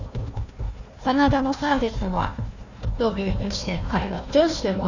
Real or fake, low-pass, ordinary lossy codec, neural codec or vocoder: fake; 7.2 kHz; none; codec, 16 kHz, 1 kbps, FunCodec, trained on Chinese and English, 50 frames a second